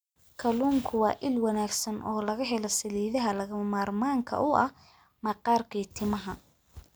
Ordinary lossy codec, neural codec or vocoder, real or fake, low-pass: none; none; real; none